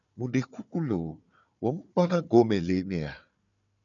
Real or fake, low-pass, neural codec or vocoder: fake; 7.2 kHz; codec, 16 kHz, 4 kbps, FunCodec, trained on Chinese and English, 50 frames a second